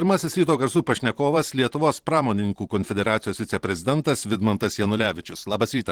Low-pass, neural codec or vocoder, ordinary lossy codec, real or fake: 19.8 kHz; vocoder, 44.1 kHz, 128 mel bands every 512 samples, BigVGAN v2; Opus, 16 kbps; fake